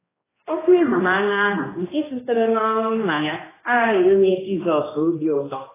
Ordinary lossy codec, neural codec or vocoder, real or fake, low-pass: AAC, 16 kbps; codec, 16 kHz, 1 kbps, X-Codec, HuBERT features, trained on general audio; fake; 3.6 kHz